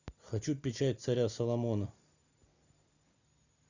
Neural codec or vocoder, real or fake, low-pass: none; real; 7.2 kHz